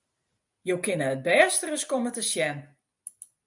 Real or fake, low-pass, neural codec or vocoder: real; 10.8 kHz; none